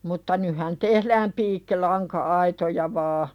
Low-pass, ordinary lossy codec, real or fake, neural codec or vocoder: 19.8 kHz; none; real; none